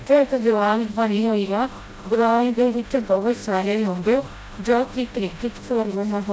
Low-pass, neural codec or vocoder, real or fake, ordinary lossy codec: none; codec, 16 kHz, 0.5 kbps, FreqCodec, smaller model; fake; none